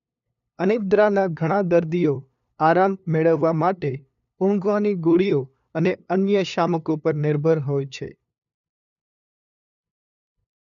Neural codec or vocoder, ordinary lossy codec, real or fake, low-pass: codec, 16 kHz, 2 kbps, FunCodec, trained on LibriTTS, 25 frames a second; none; fake; 7.2 kHz